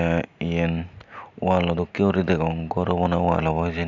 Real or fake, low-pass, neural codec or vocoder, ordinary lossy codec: real; 7.2 kHz; none; none